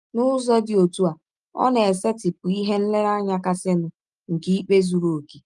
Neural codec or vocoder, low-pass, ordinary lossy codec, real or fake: none; 10.8 kHz; Opus, 32 kbps; real